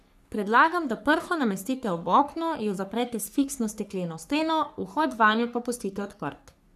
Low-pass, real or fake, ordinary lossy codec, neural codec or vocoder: 14.4 kHz; fake; none; codec, 44.1 kHz, 3.4 kbps, Pupu-Codec